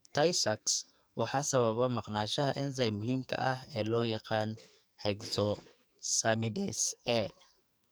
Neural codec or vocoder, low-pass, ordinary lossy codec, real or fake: codec, 44.1 kHz, 2.6 kbps, SNAC; none; none; fake